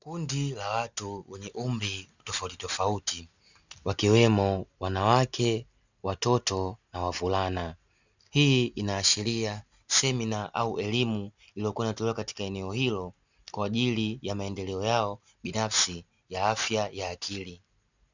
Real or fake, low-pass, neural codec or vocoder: real; 7.2 kHz; none